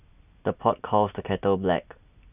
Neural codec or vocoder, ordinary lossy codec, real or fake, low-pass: none; none; real; 3.6 kHz